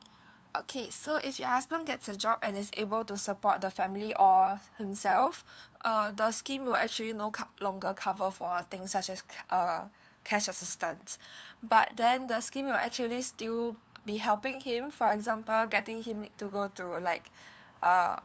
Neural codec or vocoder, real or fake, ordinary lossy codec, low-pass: codec, 16 kHz, 2 kbps, FunCodec, trained on LibriTTS, 25 frames a second; fake; none; none